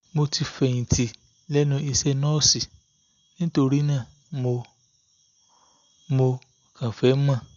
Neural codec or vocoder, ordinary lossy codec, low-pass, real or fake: none; none; 7.2 kHz; real